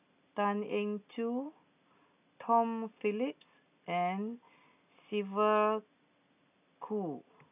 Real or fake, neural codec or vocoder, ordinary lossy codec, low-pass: real; none; none; 3.6 kHz